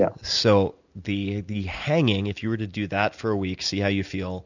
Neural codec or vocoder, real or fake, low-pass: none; real; 7.2 kHz